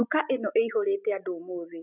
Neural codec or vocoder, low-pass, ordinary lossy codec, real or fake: none; 3.6 kHz; none; real